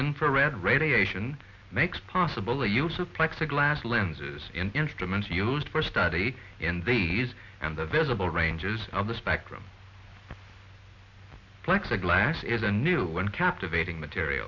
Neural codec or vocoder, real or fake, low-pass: none; real; 7.2 kHz